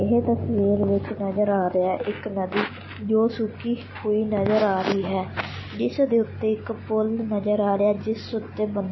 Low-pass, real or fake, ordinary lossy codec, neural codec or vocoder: 7.2 kHz; real; MP3, 24 kbps; none